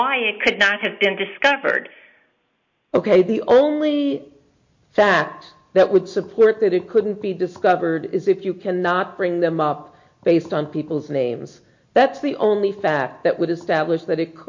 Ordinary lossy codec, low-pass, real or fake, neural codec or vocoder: MP3, 48 kbps; 7.2 kHz; real; none